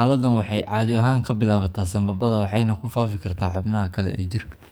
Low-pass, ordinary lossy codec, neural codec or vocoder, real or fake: none; none; codec, 44.1 kHz, 2.6 kbps, SNAC; fake